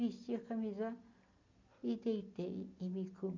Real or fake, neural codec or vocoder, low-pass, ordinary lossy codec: real; none; 7.2 kHz; none